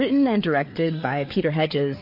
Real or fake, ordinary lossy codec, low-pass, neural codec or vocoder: fake; MP3, 32 kbps; 5.4 kHz; codec, 16 kHz, 4 kbps, FreqCodec, larger model